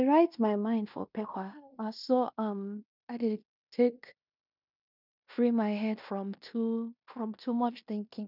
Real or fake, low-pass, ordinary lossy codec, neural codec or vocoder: fake; 5.4 kHz; none; codec, 16 kHz in and 24 kHz out, 0.9 kbps, LongCat-Audio-Codec, fine tuned four codebook decoder